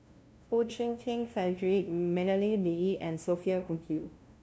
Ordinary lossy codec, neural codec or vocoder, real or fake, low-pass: none; codec, 16 kHz, 0.5 kbps, FunCodec, trained on LibriTTS, 25 frames a second; fake; none